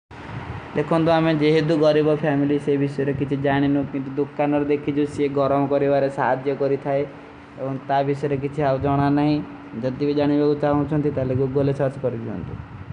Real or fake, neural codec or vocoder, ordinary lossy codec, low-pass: real; none; none; 9.9 kHz